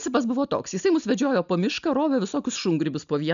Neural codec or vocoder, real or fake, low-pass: none; real; 7.2 kHz